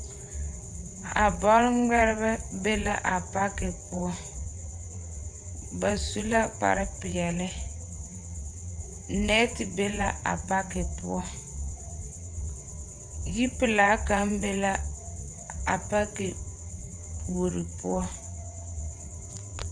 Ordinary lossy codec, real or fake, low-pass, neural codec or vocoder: MP3, 96 kbps; fake; 9.9 kHz; vocoder, 22.05 kHz, 80 mel bands, WaveNeXt